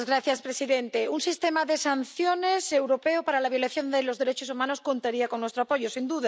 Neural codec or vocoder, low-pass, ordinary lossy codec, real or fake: none; none; none; real